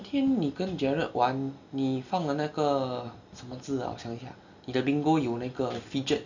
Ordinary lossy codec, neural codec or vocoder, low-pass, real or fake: none; none; 7.2 kHz; real